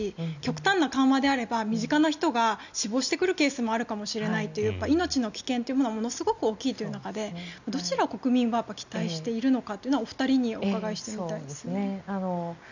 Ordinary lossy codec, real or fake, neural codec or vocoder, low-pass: none; real; none; 7.2 kHz